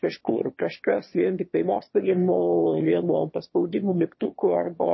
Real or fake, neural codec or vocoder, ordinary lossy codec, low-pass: fake; autoencoder, 22.05 kHz, a latent of 192 numbers a frame, VITS, trained on one speaker; MP3, 24 kbps; 7.2 kHz